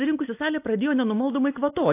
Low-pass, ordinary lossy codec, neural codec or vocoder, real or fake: 3.6 kHz; AAC, 24 kbps; none; real